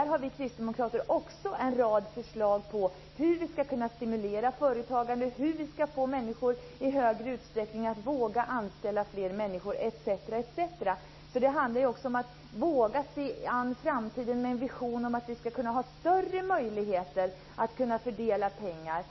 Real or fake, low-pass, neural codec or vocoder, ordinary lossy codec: real; 7.2 kHz; none; MP3, 24 kbps